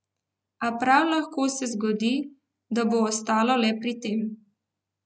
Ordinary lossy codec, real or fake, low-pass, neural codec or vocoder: none; real; none; none